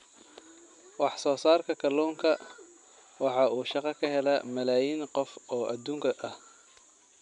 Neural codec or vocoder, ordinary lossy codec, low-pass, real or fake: none; none; 10.8 kHz; real